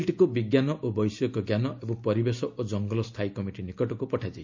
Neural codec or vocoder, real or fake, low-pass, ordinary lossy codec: none; real; 7.2 kHz; MP3, 64 kbps